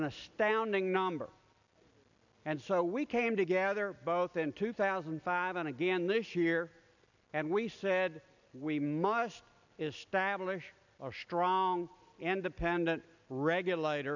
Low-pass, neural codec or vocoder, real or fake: 7.2 kHz; none; real